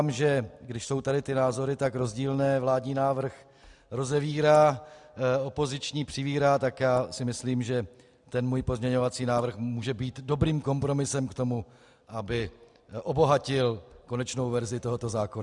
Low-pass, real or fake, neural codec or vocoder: 10.8 kHz; real; none